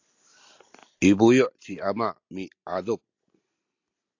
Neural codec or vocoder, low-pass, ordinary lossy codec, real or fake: none; 7.2 kHz; AAC, 48 kbps; real